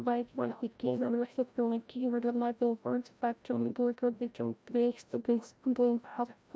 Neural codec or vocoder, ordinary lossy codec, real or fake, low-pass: codec, 16 kHz, 0.5 kbps, FreqCodec, larger model; none; fake; none